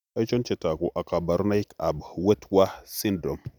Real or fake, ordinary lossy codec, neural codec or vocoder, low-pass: real; none; none; 19.8 kHz